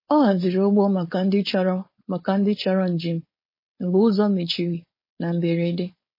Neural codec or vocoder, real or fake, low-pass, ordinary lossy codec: codec, 16 kHz, 4.8 kbps, FACodec; fake; 5.4 kHz; MP3, 24 kbps